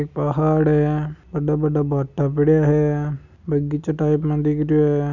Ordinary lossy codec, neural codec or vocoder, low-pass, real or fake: none; none; 7.2 kHz; real